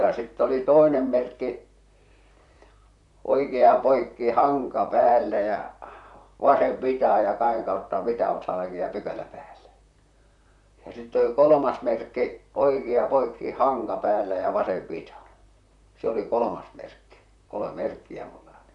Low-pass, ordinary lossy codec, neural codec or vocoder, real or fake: 10.8 kHz; none; vocoder, 44.1 kHz, 128 mel bands, Pupu-Vocoder; fake